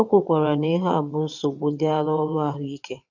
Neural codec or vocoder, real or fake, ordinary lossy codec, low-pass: vocoder, 22.05 kHz, 80 mel bands, WaveNeXt; fake; none; 7.2 kHz